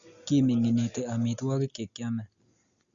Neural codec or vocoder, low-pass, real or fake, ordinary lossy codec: none; 7.2 kHz; real; Opus, 64 kbps